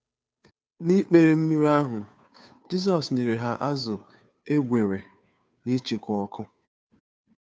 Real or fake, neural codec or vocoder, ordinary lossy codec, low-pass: fake; codec, 16 kHz, 2 kbps, FunCodec, trained on Chinese and English, 25 frames a second; none; none